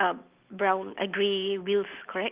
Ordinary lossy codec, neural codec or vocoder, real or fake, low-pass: Opus, 32 kbps; none; real; 3.6 kHz